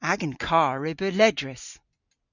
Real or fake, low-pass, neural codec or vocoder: real; 7.2 kHz; none